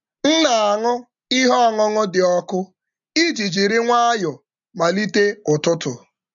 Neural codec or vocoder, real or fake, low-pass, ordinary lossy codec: none; real; 7.2 kHz; none